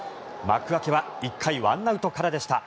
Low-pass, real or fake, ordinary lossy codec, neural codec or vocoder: none; real; none; none